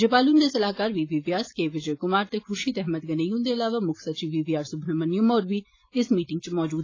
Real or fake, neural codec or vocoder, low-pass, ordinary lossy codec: real; none; 7.2 kHz; AAC, 32 kbps